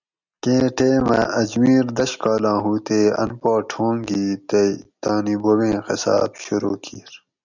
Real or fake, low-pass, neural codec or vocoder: real; 7.2 kHz; none